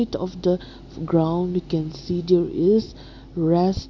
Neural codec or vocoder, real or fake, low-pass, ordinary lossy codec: none; real; 7.2 kHz; none